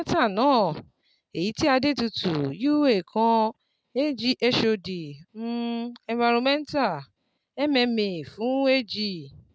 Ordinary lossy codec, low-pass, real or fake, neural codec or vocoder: none; none; real; none